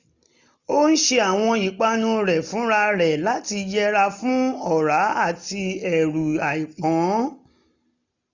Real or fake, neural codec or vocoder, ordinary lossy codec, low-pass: real; none; none; 7.2 kHz